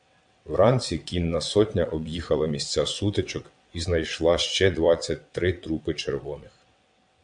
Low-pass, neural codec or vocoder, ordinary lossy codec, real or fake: 9.9 kHz; vocoder, 22.05 kHz, 80 mel bands, WaveNeXt; MP3, 64 kbps; fake